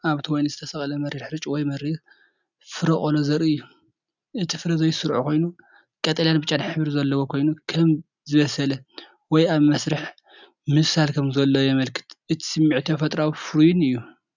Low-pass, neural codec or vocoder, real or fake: 7.2 kHz; none; real